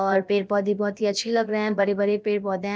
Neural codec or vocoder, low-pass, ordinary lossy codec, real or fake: codec, 16 kHz, about 1 kbps, DyCAST, with the encoder's durations; none; none; fake